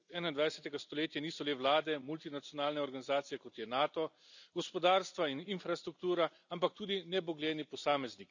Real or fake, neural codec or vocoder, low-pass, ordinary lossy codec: real; none; 7.2 kHz; none